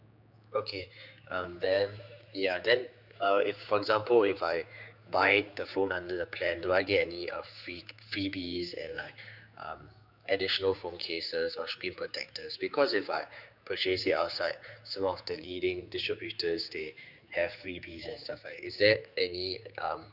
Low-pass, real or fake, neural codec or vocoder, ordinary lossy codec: 5.4 kHz; fake; codec, 16 kHz, 4 kbps, X-Codec, HuBERT features, trained on general audio; none